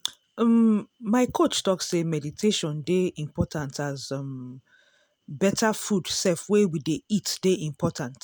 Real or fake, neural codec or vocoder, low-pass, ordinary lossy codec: real; none; none; none